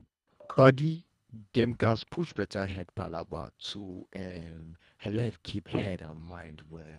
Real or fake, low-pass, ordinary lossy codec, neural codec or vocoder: fake; none; none; codec, 24 kHz, 1.5 kbps, HILCodec